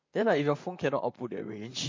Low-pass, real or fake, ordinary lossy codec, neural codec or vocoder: 7.2 kHz; fake; MP3, 48 kbps; vocoder, 44.1 kHz, 128 mel bands, Pupu-Vocoder